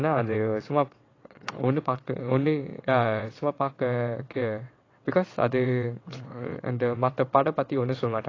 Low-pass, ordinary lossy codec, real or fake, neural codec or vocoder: 7.2 kHz; AAC, 32 kbps; fake; vocoder, 22.05 kHz, 80 mel bands, WaveNeXt